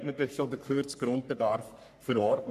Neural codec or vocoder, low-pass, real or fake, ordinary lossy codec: codec, 44.1 kHz, 3.4 kbps, Pupu-Codec; 14.4 kHz; fake; none